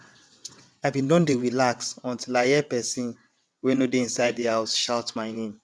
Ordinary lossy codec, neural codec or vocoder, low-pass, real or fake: none; vocoder, 22.05 kHz, 80 mel bands, WaveNeXt; none; fake